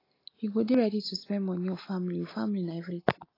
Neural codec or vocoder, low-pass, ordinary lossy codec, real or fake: none; 5.4 kHz; AAC, 24 kbps; real